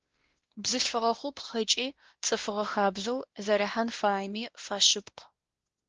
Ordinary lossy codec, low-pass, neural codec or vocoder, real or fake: Opus, 16 kbps; 7.2 kHz; codec, 16 kHz, 1 kbps, X-Codec, WavLM features, trained on Multilingual LibriSpeech; fake